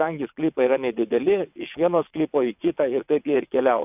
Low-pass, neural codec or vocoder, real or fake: 3.6 kHz; vocoder, 22.05 kHz, 80 mel bands, WaveNeXt; fake